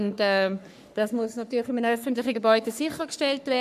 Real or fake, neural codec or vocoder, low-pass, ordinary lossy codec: fake; codec, 44.1 kHz, 3.4 kbps, Pupu-Codec; 14.4 kHz; none